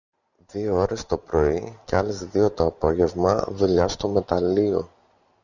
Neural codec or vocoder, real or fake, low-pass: none; real; 7.2 kHz